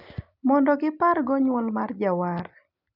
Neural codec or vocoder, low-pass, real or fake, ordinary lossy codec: none; 5.4 kHz; real; none